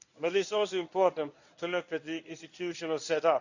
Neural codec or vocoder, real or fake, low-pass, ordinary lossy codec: codec, 24 kHz, 0.9 kbps, WavTokenizer, medium speech release version 1; fake; 7.2 kHz; none